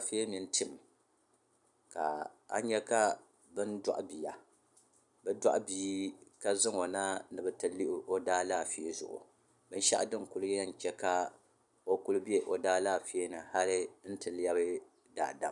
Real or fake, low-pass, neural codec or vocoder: real; 10.8 kHz; none